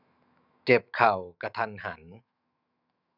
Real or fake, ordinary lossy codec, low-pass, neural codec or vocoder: fake; none; 5.4 kHz; autoencoder, 48 kHz, 128 numbers a frame, DAC-VAE, trained on Japanese speech